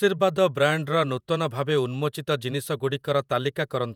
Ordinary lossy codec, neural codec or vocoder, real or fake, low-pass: none; vocoder, 48 kHz, 128 mel bands, Vocos; fake; 19.8 kHz